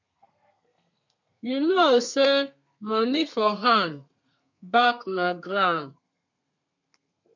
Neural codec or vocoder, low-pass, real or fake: codec, 32 kHz, 1.9 kbps, SNAC; 7.2 kHz; fake